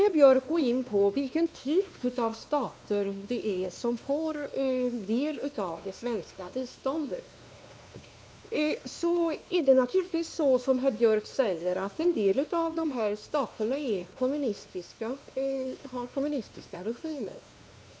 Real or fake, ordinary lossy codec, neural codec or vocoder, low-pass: fake; none; codec, 16 kHz, 2 kbps, X-Codec, WavLM features, trained on Multilingual LibriSpeech; none